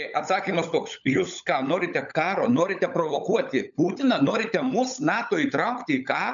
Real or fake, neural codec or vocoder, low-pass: fake; codec, 16 kHz, 16 kbps, FunCodec, trained on LibriTTS, 50 frames a second; 7.2 kHz